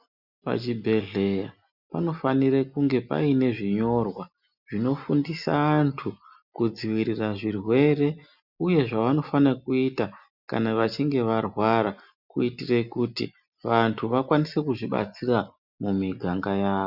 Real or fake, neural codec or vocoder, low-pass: real; none; 5.4 kHz